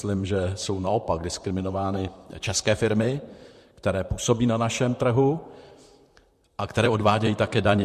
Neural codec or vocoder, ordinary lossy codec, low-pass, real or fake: vocoder, 44.1 kHz, 128 mel bands, Pupu-Vocoder; MP3, 64 kbps; 14.4 kHz; fake